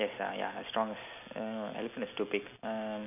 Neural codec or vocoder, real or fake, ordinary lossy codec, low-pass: none; real; none; 3.6 kHz